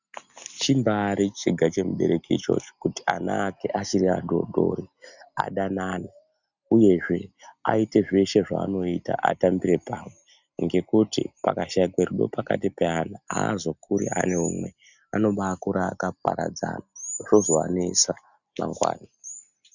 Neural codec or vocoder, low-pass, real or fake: none; 7.2 kHz; real